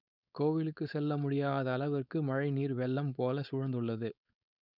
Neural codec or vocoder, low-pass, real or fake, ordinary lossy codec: codec, 16 kHz, 4.8 kbps, FACodec; 5.4 kHz; fake; none